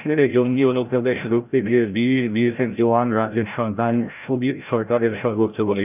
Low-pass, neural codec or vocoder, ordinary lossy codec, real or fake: 3.6 kHz; codec, 16 kHz, 0.5 kbps, FreqCodec, larger model; none; fake